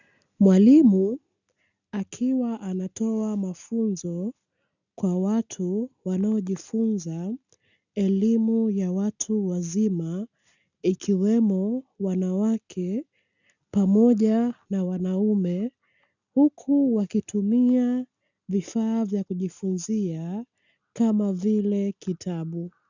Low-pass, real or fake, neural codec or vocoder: 7.2 kHz; real; none